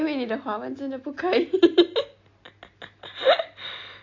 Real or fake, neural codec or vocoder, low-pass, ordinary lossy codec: real; none; 7.2 kHz; AAC, 48 kbps